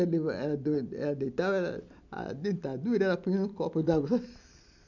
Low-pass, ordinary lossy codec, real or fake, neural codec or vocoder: 7.2 kHz; none; real; none